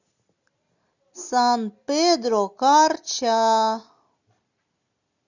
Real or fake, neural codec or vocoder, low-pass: real; none; 7.2 kHz